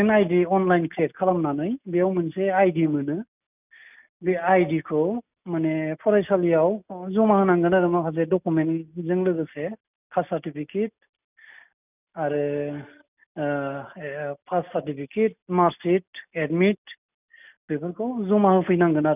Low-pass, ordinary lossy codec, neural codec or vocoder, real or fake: 3.6 kHz; none; none; real